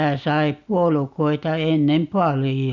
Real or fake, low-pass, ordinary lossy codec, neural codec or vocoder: real; 7.2 kHz; Opus, 64 kbps; none